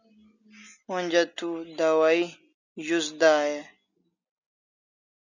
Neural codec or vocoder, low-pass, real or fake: none; 7.2 kHz; real